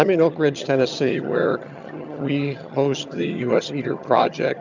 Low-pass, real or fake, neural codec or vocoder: 7.2 kHz; fake; vocoder, 22.05 kHz, 80 mel bands, HiFi-GAN